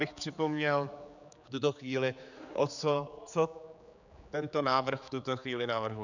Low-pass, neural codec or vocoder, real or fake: 7.2 kHz; codec, 16 kHz, 4 kbps, X-Codec, HuBERT features, trained on general audio; fake